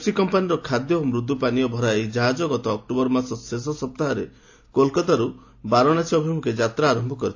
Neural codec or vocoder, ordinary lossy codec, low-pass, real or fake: none; AAC, 48 kbps; 7.2 kHz; real